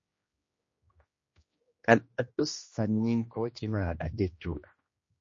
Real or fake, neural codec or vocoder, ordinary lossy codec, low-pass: fake; codec, 16 kHz, 1 kbps, X-Codec, HuBERT features, trained on general audio; MP3, 32 kbps; 7.2 kHz